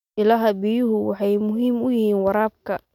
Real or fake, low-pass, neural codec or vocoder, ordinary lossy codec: real; 19.8 kHz; none; none